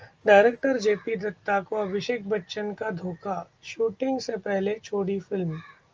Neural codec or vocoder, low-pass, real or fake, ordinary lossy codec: none; 7.2 kHz; real; Opus, 24 kbps